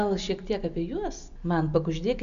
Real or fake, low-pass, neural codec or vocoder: real; 7.2 kHz; none